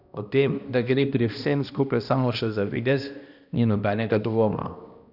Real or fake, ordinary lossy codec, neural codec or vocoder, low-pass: fake; none; codec, 16 kHz, 1 kbps, X-Codec, HuBERT features, trained on balanced general audio; 5.4 kHz